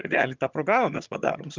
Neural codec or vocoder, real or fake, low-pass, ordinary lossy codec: vocoder, 22.05 kHz, 80 mel bands, HiFi-GAN; fake; 7.2 kHz; Opus, 32 kbps